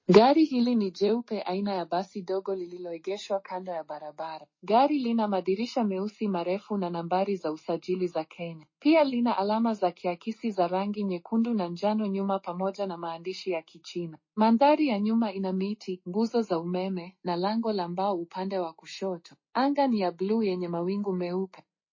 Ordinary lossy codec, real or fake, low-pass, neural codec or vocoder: MP3, 32 kbps; real; 7.2 kHz; none